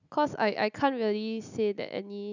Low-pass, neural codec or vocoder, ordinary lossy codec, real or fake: 7.2 kHz; none; none; real